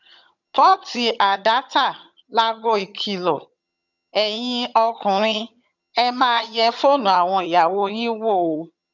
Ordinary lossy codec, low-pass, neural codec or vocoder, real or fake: none; 7.2 kHz; vocoder, 22.05 kHz, 80 mel bands, HiFi-GAN; fake